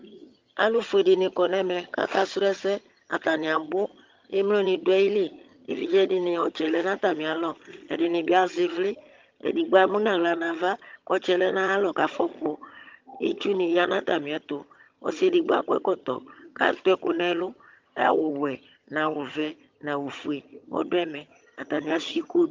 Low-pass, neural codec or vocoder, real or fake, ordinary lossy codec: 7.2 kHz; vocoder, 22.05 kHz, 80 mel bands, HiFi-GAN; fake; Opus, 32 kbps